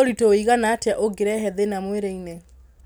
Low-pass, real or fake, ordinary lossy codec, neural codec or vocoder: none; real; none; none